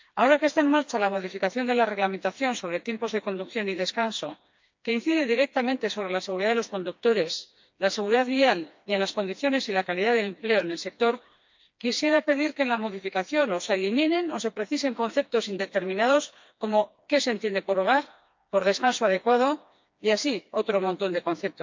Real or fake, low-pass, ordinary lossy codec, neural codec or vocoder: fake; 7.2 kHz; MP3, 48 kbps; codec, 16 kHz, 2 kbps, FreqCodec, smaller model